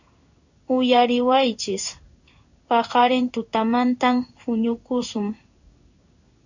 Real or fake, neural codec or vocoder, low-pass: fake; codec, 16 kHz in and 24 kHz out, 1 kbps, XY-Tokenizer; 7.2 kHz